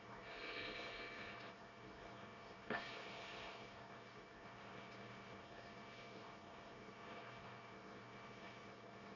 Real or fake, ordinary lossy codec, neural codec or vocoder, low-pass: fake; none; codec, 24 kHz, 1 kbps, SNAC; 7.2 kHz